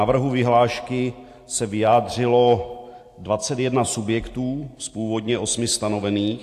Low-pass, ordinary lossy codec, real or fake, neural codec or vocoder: 14.4 kHz; AAC, 64 kbps; real; none